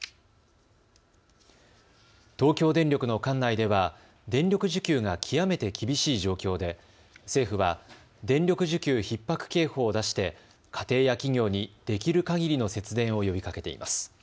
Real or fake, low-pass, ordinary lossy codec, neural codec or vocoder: real; none; none; none